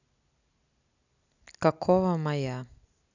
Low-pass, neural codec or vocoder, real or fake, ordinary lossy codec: 7.2 kHz; none; real; none